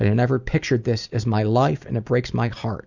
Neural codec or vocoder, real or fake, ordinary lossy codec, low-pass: none; real; Opus, 64 kbps; 7.2 kHz